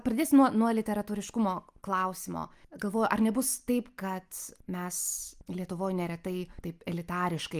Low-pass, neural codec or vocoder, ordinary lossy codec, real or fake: 14.4 kHz; none; Opus, 32 kbps; real